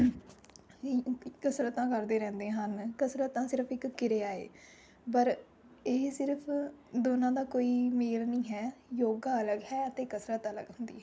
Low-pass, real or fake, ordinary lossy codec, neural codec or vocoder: none; real; none; none